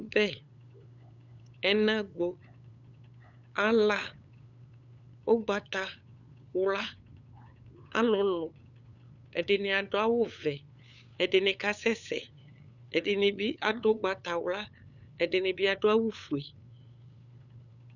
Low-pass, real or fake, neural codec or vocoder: 7.2 kHz; fake; codec, 16 kHz, 8 kbps, FunCodec, trained on LibriTTS, 25 frames a second